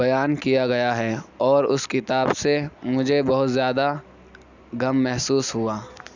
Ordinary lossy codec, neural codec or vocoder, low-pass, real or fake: none; none; 7.2 kHz; real